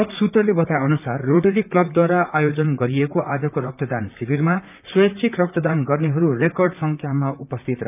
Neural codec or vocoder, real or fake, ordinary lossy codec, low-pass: vocoder, 44.1 kHz, 128 mel bands, Pupu-Vocoder; fake; none; 3.6 kHz